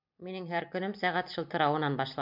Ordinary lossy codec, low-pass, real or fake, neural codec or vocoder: MP3, 48 kbps; 5.4 kHz; fake; vocoder, 44.1 kHz, 128 mel bands every 256 samples, BigVGAN v2